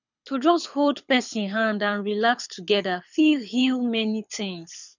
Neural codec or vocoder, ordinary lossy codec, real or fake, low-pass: codec, 24 kHz, 6 kbps, HILCodec; none; fake; 7.2 kHz